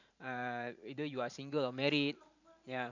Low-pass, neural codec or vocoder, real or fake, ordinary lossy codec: 7.2 kHz; vocoder, 44.1 kHz, 128 mel bands every 512 samples, BigVGAN v2; fake; none